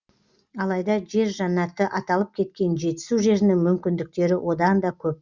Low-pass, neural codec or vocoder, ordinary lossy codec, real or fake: 7.2 kHz; none; Opus, 64 kbps; real